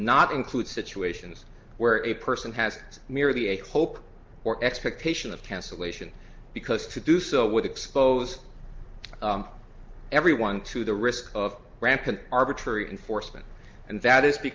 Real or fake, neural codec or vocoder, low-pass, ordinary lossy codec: real; none; 7.2 kHz; Opus, 32 kbps